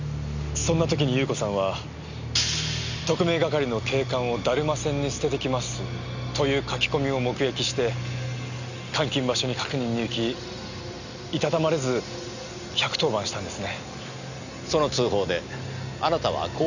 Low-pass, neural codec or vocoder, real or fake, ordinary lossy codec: 7.2 kHz; none; real; none